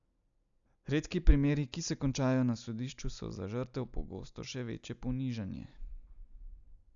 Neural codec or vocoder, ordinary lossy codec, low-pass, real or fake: none; MP3, 96 kbps; 7.2 kHz; real